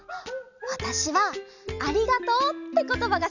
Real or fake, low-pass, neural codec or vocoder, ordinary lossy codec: real; 7.2 kHz; none; none